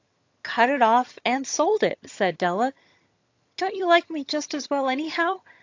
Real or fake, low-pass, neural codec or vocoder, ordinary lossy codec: fake; 7.2 kHz; vocoder, 22.05 kHz, 80 mel bands, HiFi-GAN; AAC, 48 kbps